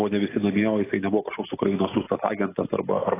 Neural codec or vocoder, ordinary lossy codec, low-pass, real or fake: none; AAC, 16 kbps; 3.6 kHz; real